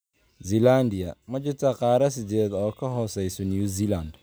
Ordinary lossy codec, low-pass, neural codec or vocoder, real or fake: none; none; none; real